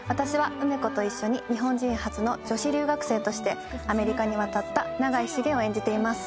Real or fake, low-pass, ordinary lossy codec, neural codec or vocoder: real; none; none; none